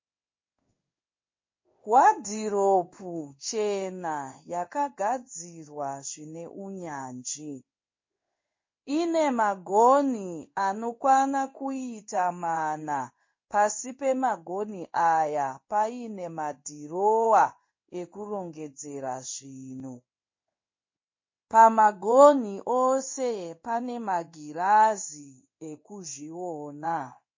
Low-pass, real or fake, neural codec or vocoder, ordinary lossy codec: 7.2 kHz; fake; codec, 16 kHz in and 24 kHz out, 1 kbps, XY-Tokenizer; MP3, 32 kbps